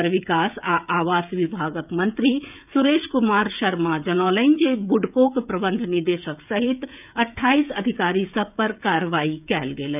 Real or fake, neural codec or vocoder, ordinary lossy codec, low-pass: fake; codec, 16 kHz, 16 kbps, FreqCodec, smaller model; none; 3.6 kHz